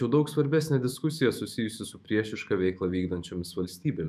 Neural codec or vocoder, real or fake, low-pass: autoencoder, 48 kHz, 128 numbers a frame, DAC-VAE, trained on Japanese speech; fake; 14.4 kHz